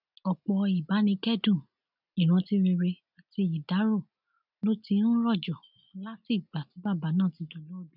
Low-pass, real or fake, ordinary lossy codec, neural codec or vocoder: 5.4 kHz; real; none; none